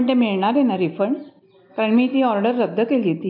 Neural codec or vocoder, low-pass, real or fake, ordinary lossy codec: none; 5.4 kHz; real; MP3, 48 kbps